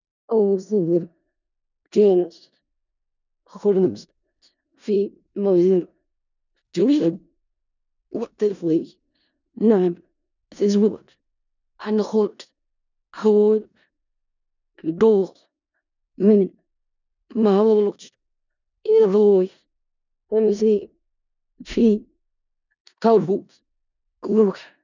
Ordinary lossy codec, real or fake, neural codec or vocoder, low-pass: none; fake; codec, 16 kHz in and 24 kHz out, 0.4 kbps, LongCat-Audio-Codec, four codebook decoder; 7.2 kHz